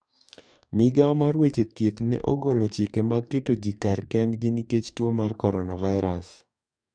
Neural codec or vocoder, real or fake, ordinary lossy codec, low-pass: codec, 44.1 kHz, 2.6 kbps, DAC; fake; none; 9.9 kHz